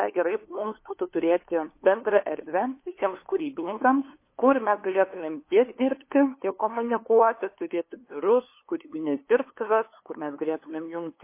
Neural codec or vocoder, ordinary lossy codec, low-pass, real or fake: codec, 16 kHz, 2 kbps, FunCodec, trained on LibriTTS, 25 frames a second; MP3, 24 kbps; 3.6 kHz; fake